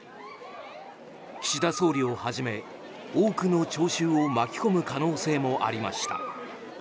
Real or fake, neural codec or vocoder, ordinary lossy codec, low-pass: real; none; none; none